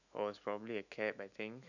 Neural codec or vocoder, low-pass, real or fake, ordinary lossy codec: autoencoder, 48 kHz, 128 numbers a frame, DAC-VAE, trained on Japanese speech; 7.2 kHz; fake; none